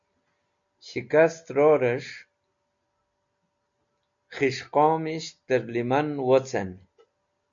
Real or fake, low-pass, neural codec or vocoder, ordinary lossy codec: real; 7.2 kHz; none; MP3, 64 kbps